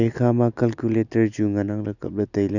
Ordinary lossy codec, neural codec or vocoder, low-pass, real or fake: none; none; 7.2 kHz; real